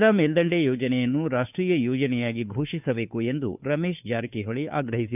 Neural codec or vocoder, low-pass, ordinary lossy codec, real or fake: codec, 16 kHz, 2 kbps, FunCodec, trained on Chinese and English, 25 frames a second; 3.6 kHz; none; fake